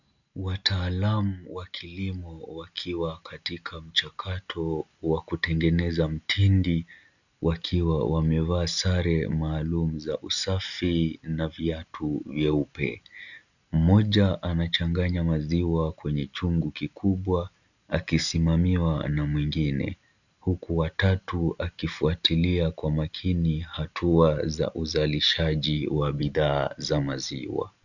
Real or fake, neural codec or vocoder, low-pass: real; none; 7.2 kHz